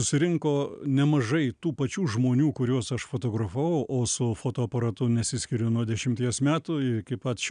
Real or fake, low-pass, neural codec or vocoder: real; 9.9 kHz; none